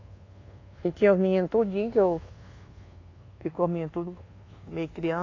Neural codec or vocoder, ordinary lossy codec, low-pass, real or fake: codec, 24 kHz, 1.2 kbps, DualCodec; AAC, 32 kbps; 7.2 kHz; fake